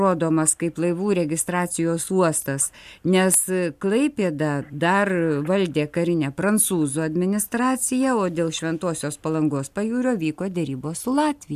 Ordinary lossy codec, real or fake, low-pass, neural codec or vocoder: MP3, 96 kbps; real; 14.4 kHz; none